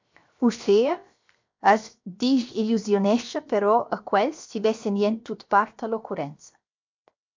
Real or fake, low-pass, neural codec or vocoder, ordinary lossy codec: fake; 7.2 kHz; codec, 16 kHz, 0.7 kbps, FocalCodec; MP3, 64 kbps